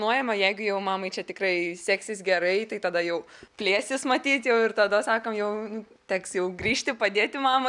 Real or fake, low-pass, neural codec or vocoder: real; 10.8 kHz; none